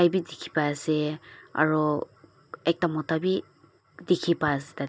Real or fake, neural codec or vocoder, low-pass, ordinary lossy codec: real; none; none; none